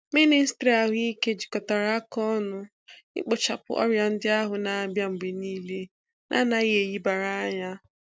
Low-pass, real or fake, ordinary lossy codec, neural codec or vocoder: none; real; none; none